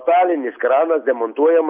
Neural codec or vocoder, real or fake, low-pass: none; real; 3.6 kHz